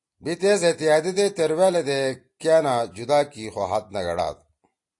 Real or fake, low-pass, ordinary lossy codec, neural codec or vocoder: real; 10.8 kHz; AAC, 48 kbps; none